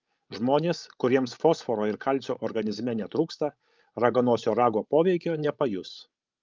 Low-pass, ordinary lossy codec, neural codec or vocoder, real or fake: 7.2 kHz; Opus, 32 kbps; codec, 16 kHz, 16 kbps, FreqCodec, larger model; fake